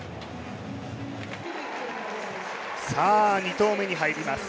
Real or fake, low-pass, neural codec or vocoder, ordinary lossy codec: real; none; none; none